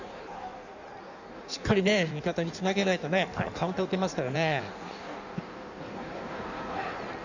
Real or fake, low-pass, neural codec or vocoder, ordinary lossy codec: fake; 7.2 kHz; codec, 16 kHz in and 24 kHz out, 1.1 kbps, FireRedTTS-2 codec; none